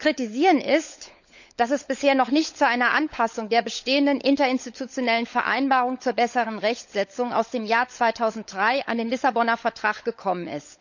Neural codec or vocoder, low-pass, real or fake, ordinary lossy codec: codec, 16 kHz, 16 kbps, FunCodec, trained on LibriTTS, 50 frames a second; 7.2 kHz; fake; none